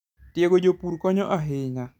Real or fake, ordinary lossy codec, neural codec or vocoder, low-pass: real; none; none; 19.8 kHz